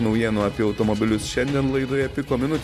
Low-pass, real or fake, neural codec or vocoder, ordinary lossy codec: 14.4 kHz; fake; vocoder, 44.1 kHz, 128 mel bands every 512 samples, BigVGAN v2; AAC, 96 kbps